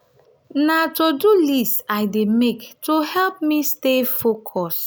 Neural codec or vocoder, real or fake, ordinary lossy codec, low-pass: none; real; none; none